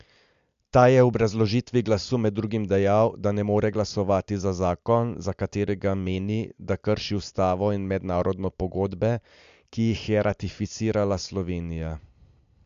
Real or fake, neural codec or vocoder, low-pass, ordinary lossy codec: real; none; 7.2 kHz; MP3, 64 kbps